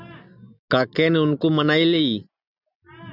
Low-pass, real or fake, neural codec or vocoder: 5.4 kHz; real; none